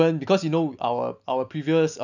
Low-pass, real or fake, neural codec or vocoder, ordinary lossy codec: 7.2 kHz; real; none; none